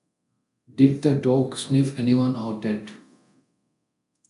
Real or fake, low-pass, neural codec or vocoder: fake; 10.8 kHz; codec, 24 kHz, 0.9 kbps, DualCodec